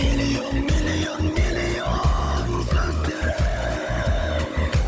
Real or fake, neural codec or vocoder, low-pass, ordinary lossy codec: fake; codec, 16 kHz, 16 kbps, FunCodec, trained on Chinese and English, 50 frames a second; none; none